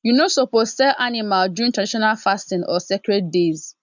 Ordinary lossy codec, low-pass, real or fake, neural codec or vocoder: none; 7.2 kHz; real; none